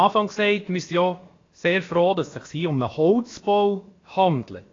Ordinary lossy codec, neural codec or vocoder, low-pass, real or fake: AAC, 32 kbps; codec, 16 kHz, about 1 kbps, DyCAST, with the encoder's durations; 7.2 kHz; fake